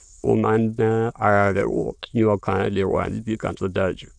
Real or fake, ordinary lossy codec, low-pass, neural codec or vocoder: fake; none; 9.9 kHz; autoencoder, 22.05 kHz, a latent of 192 numbers a frame, VITS, trained on many speakers